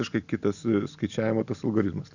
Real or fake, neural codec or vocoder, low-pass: real; none; 7.2 kHz